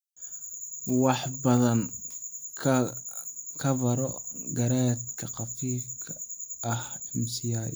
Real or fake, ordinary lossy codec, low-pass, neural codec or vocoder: real; none; none; none